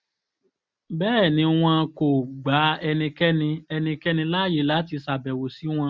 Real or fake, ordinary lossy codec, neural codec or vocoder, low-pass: real; none; none; none